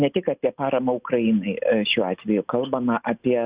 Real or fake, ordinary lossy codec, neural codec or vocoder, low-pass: real; Opus, 64 kbps; none; 3.6 kHz